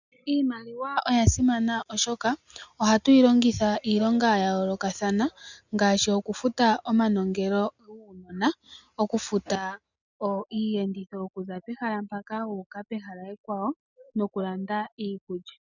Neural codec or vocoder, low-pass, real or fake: none; 7.2 kHz; real